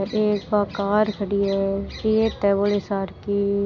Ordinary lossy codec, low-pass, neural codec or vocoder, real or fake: none; 7.2 kHz; none; real